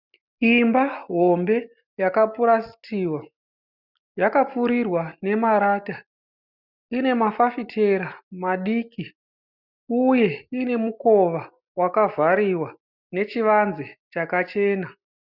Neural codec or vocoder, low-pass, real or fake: none; 5.4 kHz; real